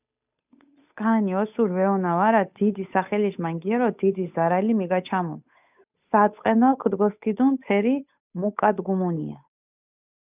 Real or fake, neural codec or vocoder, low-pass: fake; codec, 16 kHz, 8 kbps, FunCodec, trained on Chinese and English, 25 frames a second; 3.6 kHz